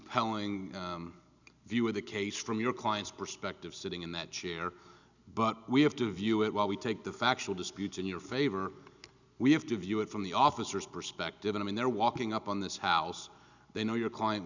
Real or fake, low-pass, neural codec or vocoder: real; 7.2 kHz; none